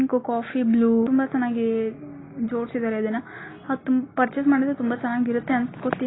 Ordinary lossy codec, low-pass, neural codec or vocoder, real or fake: AAC, 16 kbps; 7.2 kHz; none; real